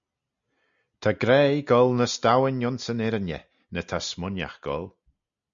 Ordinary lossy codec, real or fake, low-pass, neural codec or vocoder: MP3, 64 kbps; real; 7.2 kHz; none